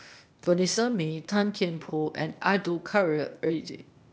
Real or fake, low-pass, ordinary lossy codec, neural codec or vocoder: fake; none; none; codec, 16 kHz, 0.8 kbps, ZipCodec